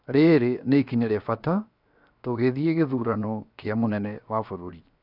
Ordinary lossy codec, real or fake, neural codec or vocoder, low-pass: none; fake; codec, 16 kHz, 0.7 kbps, FocalCodec; 5.4 kHz